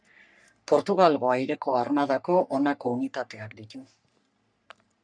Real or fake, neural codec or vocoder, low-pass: fake; codec, 44.1 kHz, 3.4 kbps, Pupu-Codec; 9.9 kHz